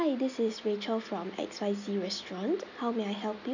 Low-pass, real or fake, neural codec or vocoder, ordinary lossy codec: 7.2 kHz; real; none; none